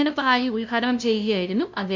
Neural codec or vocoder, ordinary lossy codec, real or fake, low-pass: codec, 16 kHz, 0.5 kbps, FunCodec, trained on LibriTTS, 25 frames a second; none; fake; 7.2 kHz